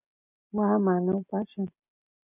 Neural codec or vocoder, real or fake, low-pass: none; real; 3.6 kHz